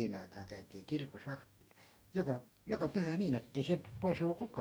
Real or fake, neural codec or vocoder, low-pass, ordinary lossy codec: fake; codec, 44.1 kHz, 2.6 kbps, DAC; none; none